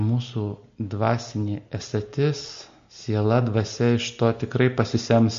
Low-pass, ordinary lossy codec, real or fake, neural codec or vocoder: 7.2 kHz; MP3, 48 kbps; real; none